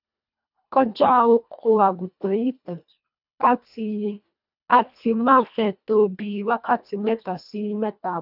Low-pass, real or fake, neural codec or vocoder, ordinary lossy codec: 5.4 kHz; fake; codec, 24 kHz, 1.5 kbps, HILCodec; none